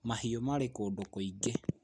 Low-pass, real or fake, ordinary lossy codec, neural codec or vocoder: 9.9 kHz; real; none; none